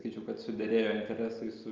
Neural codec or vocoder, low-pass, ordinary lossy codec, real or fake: none; 7.2 kHz; Opus, 24 kbps; real